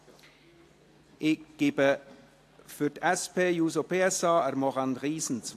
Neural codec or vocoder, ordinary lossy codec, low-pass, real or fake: none; AAC, 64 kbps; 14.4 kHz; real